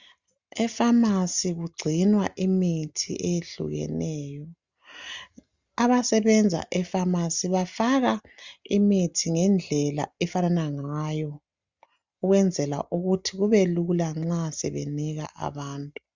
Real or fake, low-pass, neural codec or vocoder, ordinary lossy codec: real; 7.2 kHz; none; Opus, 64 kbps